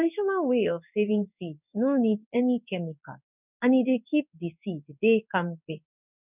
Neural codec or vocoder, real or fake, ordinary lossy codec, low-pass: codec, 16 kHz in and 24 kHz out, 1 kbps, XY-Tokenizer; fake; none; 3.6 kHz